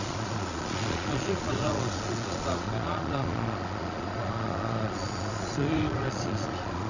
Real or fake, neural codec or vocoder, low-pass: fake; vocoder, 22.05 kHz, 80 mel bands, Vocos; 7.2 kHz